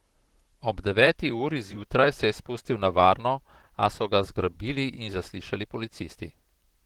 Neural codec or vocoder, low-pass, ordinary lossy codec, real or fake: vocoder, 44.1 kHz, 128 mel bands, Pupu-Vocoder; 19.8 kHz; Opus, 16 kbps; fake